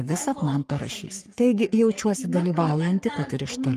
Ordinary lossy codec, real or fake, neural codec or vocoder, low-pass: Opus, 32 kbps; fake; codec, 44.1 kHz, 3.4 kbps, Pupu-Codec; 14.4 kHz